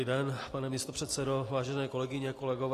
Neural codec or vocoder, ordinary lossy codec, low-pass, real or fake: none; AAC, 48 kbps; 14.4 kHz; real